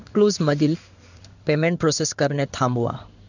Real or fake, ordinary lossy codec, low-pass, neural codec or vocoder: fake; none; 7.2 kHz; codec, 16 kHz in and 24 kHz out, 1 kbps, XY-Tokenizer